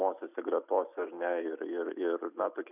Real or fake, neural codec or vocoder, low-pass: real; none; 3.6 kHz